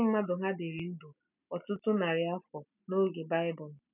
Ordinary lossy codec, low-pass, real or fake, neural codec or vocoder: none; 3.6 kHz; real; none